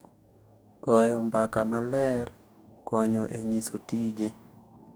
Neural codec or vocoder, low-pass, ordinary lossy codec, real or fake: codec, 44.1 kHz, 2.6 kbps, DAC; none; none; fake